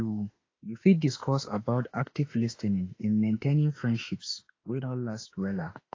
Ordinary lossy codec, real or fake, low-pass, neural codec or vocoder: AAC, 32 kbps; fake; 7.2 kHz; autoencoder, 48 kHz, 32 numbers a frame, DAC-VAE, trained on Japanese speech